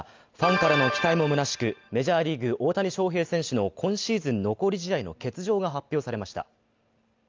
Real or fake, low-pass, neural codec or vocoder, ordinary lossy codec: real; 7.2 kHz; none; Opus, 24 kbps